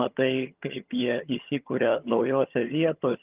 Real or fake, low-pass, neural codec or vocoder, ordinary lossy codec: fake; 3.6 kHz; vocoder, 22.05 kHz, 80 mel bands, HiFi-GAN; Opus, 32 kbps